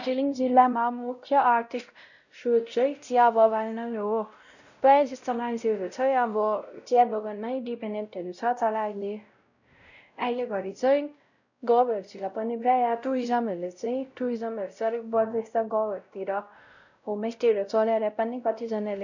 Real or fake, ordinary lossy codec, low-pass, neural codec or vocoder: fake; none; 7.2 kHz; codec, 16 kHz, 0.5 kbps, X-Codec, WavLM features, trained on Multilingual LibriSpeech